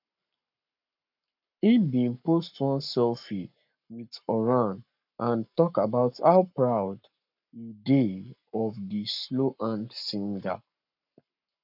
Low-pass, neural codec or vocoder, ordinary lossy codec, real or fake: 5.4 kHz; codec, 44.1 kHz, 7.8 kbps, Pupu-Codec; none; fake